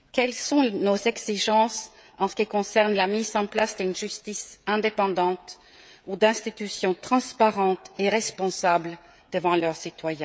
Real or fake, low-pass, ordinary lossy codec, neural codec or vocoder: fake; none; none; codec, 16 kHz, 16 kbps, FreqCodec, smaller model